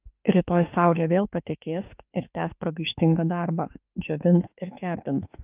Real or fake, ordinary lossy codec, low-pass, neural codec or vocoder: fake; Opus, 32 kbps; 3.6 kHz; autoencoder, 48 kHz, 32 numbers a frame, DAC-VAE, trained on Japanese speech